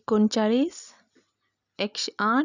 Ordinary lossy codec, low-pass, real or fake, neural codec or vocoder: none; 7.2 kHz; real; none